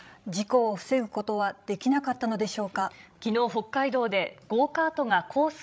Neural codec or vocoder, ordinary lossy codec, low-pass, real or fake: codec, 16 kHz, 16 kbps, FreqCodec, larger model; none; none; fake